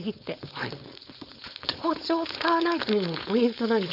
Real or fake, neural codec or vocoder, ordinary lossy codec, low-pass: fake; codec, 16 kHz, 4.8 kbps, FACodec; none; 5.4 kHz